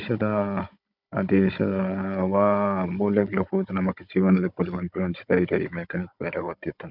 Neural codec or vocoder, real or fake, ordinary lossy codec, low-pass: codec, 16 kHz, 16 kbps, FunCodec, trained on Chinese and English, 50 frames a second; fake; none; 5.4 kHz